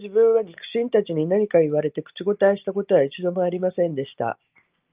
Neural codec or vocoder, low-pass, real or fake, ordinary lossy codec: none; 3.6 kHz; real; Opus, 32 kbps